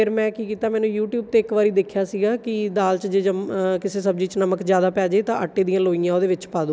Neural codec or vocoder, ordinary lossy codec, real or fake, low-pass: none; none; real; none